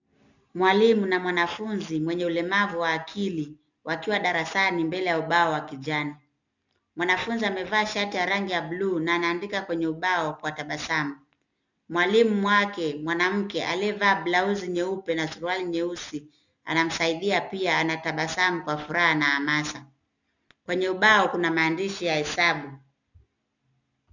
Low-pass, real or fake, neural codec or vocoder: 7.2 kHz; real; none